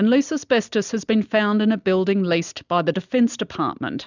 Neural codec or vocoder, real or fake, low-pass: none; real; 7.2 kHz